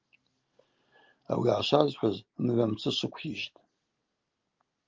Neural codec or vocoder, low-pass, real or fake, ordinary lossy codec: none; 7.2 kHz; real; Opus, 32 kbps